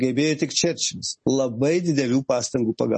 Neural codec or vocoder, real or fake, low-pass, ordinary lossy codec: none; real; 10.8 kHz; MP3, 32 kbps